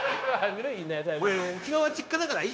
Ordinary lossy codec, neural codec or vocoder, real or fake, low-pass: none; codec, 16 kHz, 0.9 kbps, LongCat-Audio-Codec; fake; none